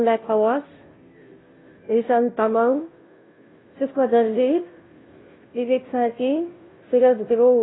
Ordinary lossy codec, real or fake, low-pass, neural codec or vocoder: AAC, 16 kbps; fake; 7.2 kHz; codec, 16 kHz, 0.5 kbps, FunCodec, trained on Chinese and English, 25 frames a second